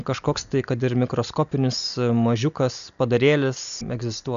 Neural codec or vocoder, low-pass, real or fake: none; 7.2 kHz; real